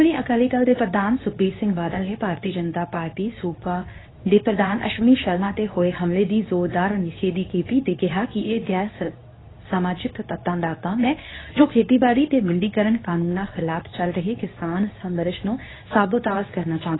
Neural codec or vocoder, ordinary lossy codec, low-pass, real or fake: codec, 24 kHz, 0.9 kbps, WavTokenizer, medium speech release version 2; AAC, 16 kbps; 7.2 kHz; fake